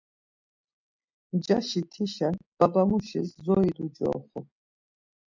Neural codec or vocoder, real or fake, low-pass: none; real; 7.2 kHz